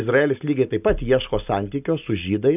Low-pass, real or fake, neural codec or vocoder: 3.6 kHz; real; none